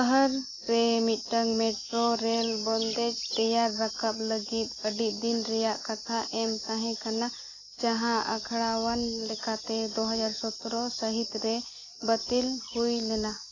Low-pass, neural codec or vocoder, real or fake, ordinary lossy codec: 7.2 kHz; none; real; AAC, 32 kbps